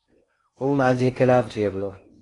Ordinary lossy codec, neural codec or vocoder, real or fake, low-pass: AAC, 32 kbps; codec, 16 kHz in and 24 kHz out, 0.6 kbps, FocalCodec, streaming, 4096 codes; fake; 10.8 kHz